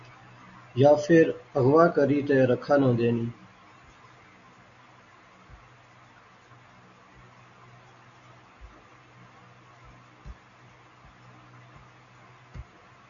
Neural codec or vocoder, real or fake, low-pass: none; real; 7.2 kHz